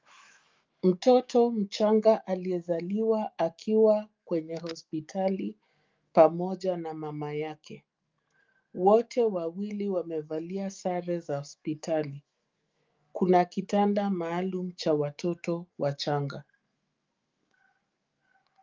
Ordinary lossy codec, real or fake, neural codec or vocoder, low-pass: Opus, 32 kbps; fake; autoencoder, 48 kHz, 128 numbers a frame, DAC-VAE, trained on Japanese speech; 7.2 kHz